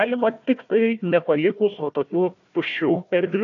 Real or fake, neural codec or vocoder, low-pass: fake; codec, 16 kHz, 1 kbps, FunCodec, trained on Chinese and English, 50 frames a second; 7.2 kHz